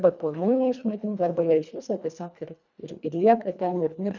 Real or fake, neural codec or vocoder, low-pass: fake; codec, 24 kHz, 1.5 kbps, HILCodec; 7.2 kHz